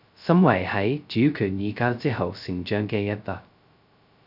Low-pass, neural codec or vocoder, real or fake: 5.4 kHz; codec, 16 kHz, 0.2 kbps, FocalCodec; fake